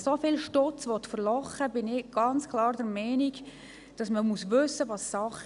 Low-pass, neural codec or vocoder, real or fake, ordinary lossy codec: 10.8 kHz; none; real; none